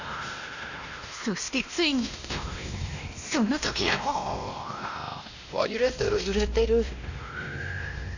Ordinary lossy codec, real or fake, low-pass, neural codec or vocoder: none; fake; 7.2 kHz; codec, 16 kHz, 1 kbps, X-Codec, WavLM features, trained on Multilingual LibriSpeech